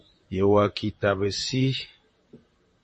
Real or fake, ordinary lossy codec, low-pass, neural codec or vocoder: fake; MP3, 32 kbps; 10.8 kHz; vocoder, 44.1 kHz, 128 mel bands, Pupu-Vocoder